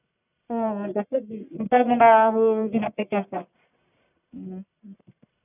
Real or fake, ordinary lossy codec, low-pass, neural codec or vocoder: fake; none; 3.6 kHz; codec, 44.1 kHz, 1.7 kbps, Pupu-Codec